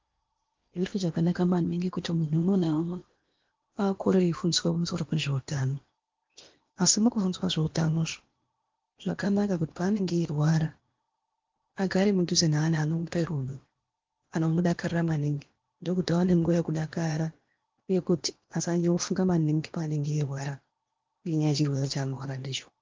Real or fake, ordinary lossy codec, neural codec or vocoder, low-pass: fake; Opus, 24 kbps; codec, 16 kHz in and 24 kHz out, 0.8 kbps, FocalCodec, streaming, 65536 codes; 7.2 kHz